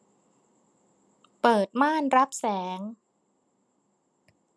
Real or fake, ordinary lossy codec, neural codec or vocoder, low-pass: real; none; none; none